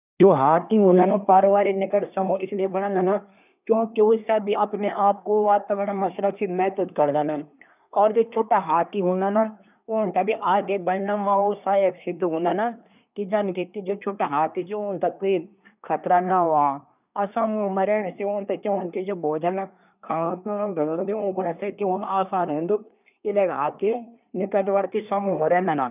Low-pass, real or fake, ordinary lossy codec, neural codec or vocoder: 3.6 kHz; fake; none; codec, 24 kHz, 1 kbps, SNAC